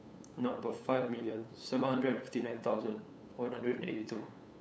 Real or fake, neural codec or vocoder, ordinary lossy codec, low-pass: fake; codec, 16 kHz, 8 kbps, FunCodec, trained on LibriTTS, 25 frames a second; none; none